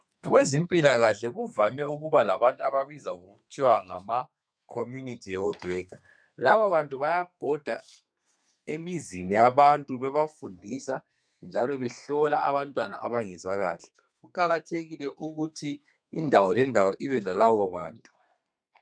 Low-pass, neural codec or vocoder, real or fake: 9.9 kHz; codec, 32 kHz, 1.9 kbps, SNAC; fake